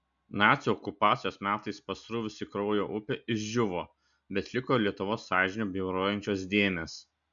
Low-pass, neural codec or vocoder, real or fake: 7.2 kHz; none; real